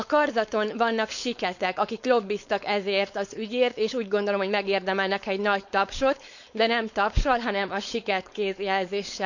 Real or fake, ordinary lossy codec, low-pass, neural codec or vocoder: fake; none; 7.2 kHz; codec, 16 kHz, 4.8 kbps, FACodec